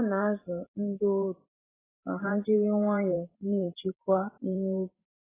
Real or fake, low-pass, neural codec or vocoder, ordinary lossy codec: fake; 3.6 kHz; vocoder, 44.1 kHz, 128 mel bands every 512 samples, BigVGAN v2; AAC, 16 kbps